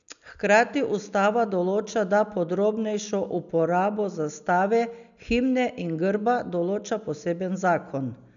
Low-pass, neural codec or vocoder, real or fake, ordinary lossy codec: 7.2 kHz; none; real; none